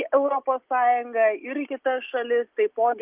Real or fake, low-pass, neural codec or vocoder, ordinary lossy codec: real; 3.6 kHz; none; Opus, 24 kbps